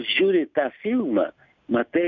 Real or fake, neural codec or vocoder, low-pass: real; none; 7.2 kHz